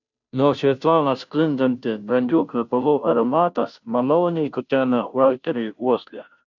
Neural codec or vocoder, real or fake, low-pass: codec, 16 kHz, 0.5 kbps, FunCodec, trained on Chinese and English, 25 frames a second; fake; 7.2 kHz